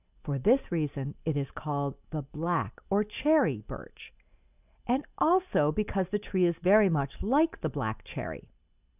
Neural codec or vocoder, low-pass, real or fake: none; 3.6 kHz; real